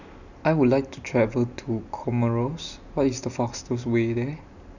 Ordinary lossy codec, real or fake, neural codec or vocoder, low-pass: none; real; none; 7.2 kHz